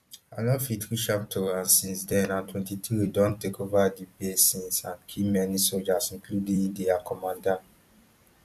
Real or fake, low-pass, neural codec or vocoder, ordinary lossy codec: fake; 14.4 kHz; vocoder, 44.1 kHz, 128 mel bands every 256 samples, BigVGAN v2; none